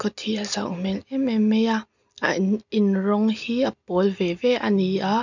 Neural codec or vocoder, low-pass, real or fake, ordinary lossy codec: none; 7.2 kHz; real; none